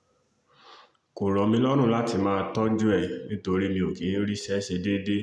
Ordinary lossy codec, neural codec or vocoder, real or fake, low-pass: none; none; real; none